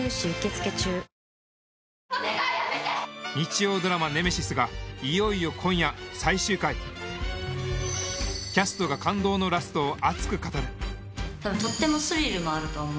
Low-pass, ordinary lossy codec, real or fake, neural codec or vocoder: none; none; real; none